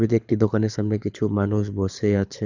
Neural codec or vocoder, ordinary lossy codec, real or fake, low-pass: codec, 24 kHz, 6 kbps, HILCodec; none; fake; 7.2 kHz